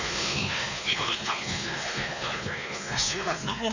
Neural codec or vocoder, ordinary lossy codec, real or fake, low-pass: codec, 16 kHz, 2 kbps, X-Codec, WavLM features, trained on Multilingual LibriSpeech; none; fake; 7.2 kHz